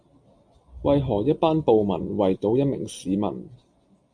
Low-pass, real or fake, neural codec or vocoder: 9.9 kHz; real; none